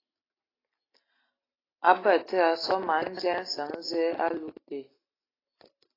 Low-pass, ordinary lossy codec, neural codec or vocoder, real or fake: 5.4 kHz; AAC, 24 kbps; none; real